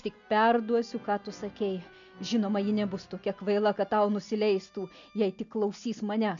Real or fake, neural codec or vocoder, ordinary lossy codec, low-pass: real; none; MP3, 96 kbps; 7.2 kHz